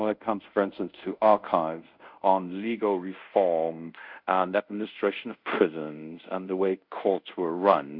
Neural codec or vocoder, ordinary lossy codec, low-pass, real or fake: codec, 24 kHz, 0.5 kbps, DualCodec; Opus, 64 kbps; 5.4 kHz; fake